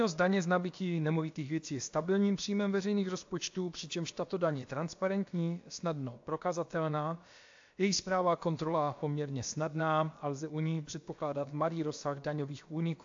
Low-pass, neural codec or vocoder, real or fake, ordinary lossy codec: 7.2 kHz; codec, 16 kHz, about 1 kbps, DyCAST, with the encoder's durations; fake; AAC, 48 kbps